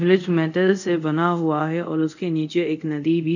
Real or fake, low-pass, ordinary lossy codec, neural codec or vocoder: fake; 7.2 kHz; none; codec, 24 kHz, 0.5 kbps, DualCodec